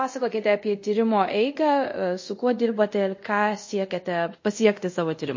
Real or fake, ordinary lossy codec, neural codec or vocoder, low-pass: fake; MP3, 32 kbps; codec, 24 kHz, 0.5 kbps, DualCodec; 7.2 kHz